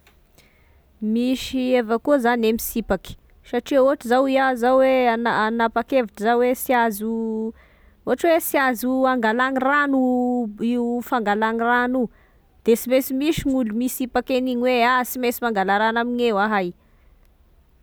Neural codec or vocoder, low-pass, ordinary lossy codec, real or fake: none; none; none; real